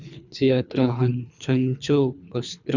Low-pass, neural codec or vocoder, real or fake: 7.2 kHz; codec, 24 kHz, 3 kbps, HILCodec; fake